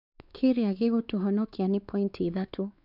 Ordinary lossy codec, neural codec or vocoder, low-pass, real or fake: none; codec, 16 kHz, 4 kbps, X-Codec, WavLM features, trained on Multilingual LibriSpeech; 5.4 kHz; fake